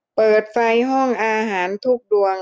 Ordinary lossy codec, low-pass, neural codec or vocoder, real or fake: none; none; none; real